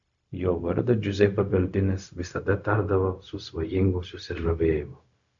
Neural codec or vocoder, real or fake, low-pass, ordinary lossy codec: codec, 16 kHz, 0.4 kbps, LongCat-Audio-Codec; fake; 7.2 kHz; AAC, 64 kbps